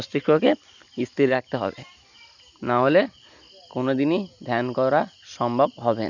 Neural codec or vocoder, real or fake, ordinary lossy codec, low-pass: none; real; none; 7.2 kHz